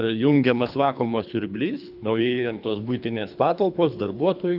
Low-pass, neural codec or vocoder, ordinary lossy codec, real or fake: 5.4 kHz; codec, 24 kHz, 3 kbps, HILCodec; AAC, 48 kbps; fake